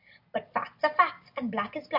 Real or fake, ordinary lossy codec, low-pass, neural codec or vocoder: real; AAC, 48 kbps; 5.4 kHz; none